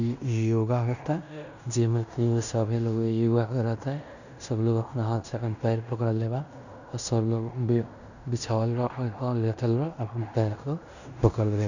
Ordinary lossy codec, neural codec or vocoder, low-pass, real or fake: none; codec, 16 kHz in and 24 kHz out, 0.9 kbps, LongCat-Audio-Codec, fine tuned four codebook decoder; 7.2 kHz; fake